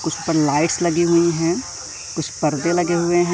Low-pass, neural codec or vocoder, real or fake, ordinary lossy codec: none; none; real; none